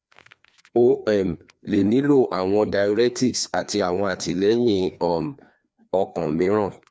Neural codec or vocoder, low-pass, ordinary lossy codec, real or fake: codec, 16 kHz, 2 kbps, FreqCodec, larger model; none; none; fake